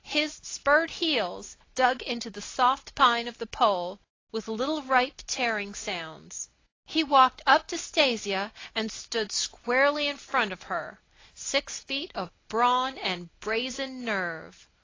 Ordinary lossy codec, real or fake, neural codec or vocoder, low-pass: AAC, 32 kbps; real; none; 7.2 kHz